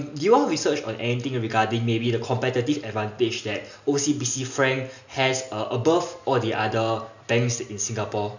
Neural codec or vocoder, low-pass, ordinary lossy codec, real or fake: none; 7.2 kHz; none; real